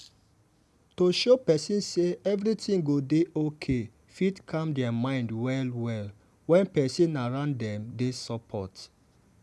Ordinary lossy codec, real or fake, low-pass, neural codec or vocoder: none; real; none; none